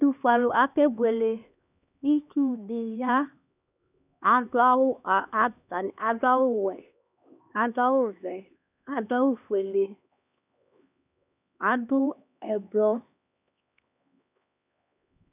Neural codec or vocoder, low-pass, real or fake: codec, 16 kHz, 2 kbps, X-Codec, HuBERT features, trained on LibriSpeech; 3.6 kHz; fake